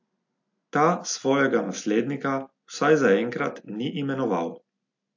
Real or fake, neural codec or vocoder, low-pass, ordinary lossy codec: real; none; 7.2 kHz; AAC, 48 kbps